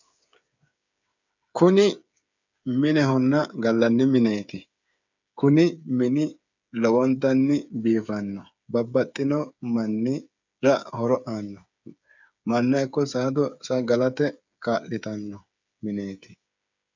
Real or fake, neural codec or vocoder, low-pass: fake; codec, 16 kHz, 8 kbps, FreqCodec, smaller model; 7.2 kHz